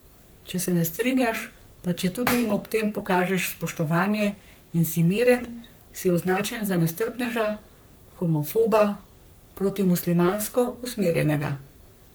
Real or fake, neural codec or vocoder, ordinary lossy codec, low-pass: fake; codec, 44.1 kHz, 3.4 kbps, Pupu-Codec; none; none